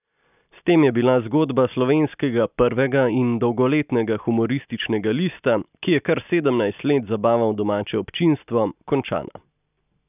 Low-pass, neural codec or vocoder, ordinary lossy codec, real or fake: 3.6 kHz; none; none; real